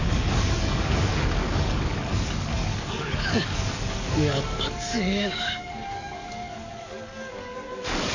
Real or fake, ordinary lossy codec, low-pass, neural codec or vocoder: fake; none; 7.2 kHz; codec, 44.1 kHz, 7.8 kbps, DAC